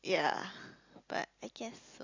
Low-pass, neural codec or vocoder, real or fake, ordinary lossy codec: 7.2 kHz; none; real; AAC, 48 kbps